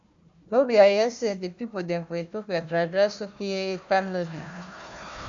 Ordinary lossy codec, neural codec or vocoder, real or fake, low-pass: MP3, 64 kbps; codec, 16 kHz, 1 kbps, FunCodec, trained on Chinese and English, 50 frames a second; fake; 7.2 kHz